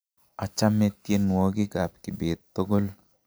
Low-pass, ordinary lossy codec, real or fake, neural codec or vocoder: none; none; real; none